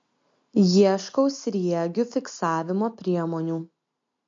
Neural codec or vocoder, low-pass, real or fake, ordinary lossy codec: none; 7.2 kHz; real; MP3, 48 kbps